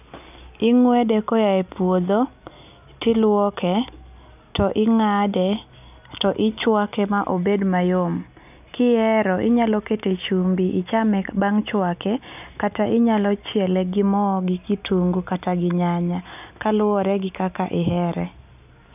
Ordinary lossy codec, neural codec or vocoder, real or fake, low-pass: none; none; real; 3.6 kHz